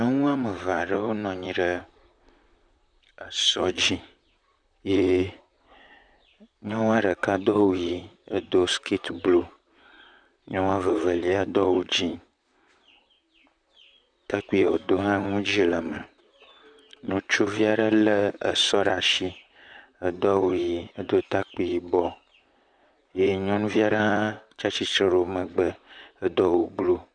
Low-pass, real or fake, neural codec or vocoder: 9.9 kHz; fake; vocoder, 44.1 kHz, 128 mel bands, Pupu-Vocoder